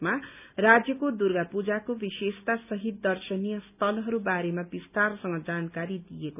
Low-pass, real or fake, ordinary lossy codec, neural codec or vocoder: 3.6 kHz; real; none; none